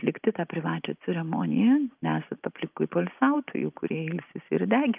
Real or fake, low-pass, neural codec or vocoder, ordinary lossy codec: real; 3.6 kHz; none; Opus, 24 kbps